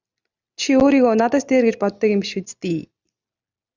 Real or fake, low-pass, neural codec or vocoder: real; 7.2 kHz; none